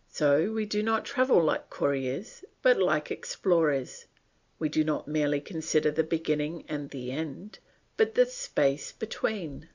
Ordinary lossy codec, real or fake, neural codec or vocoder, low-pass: Opus, 64 kbps; real; none; 7.2 kHz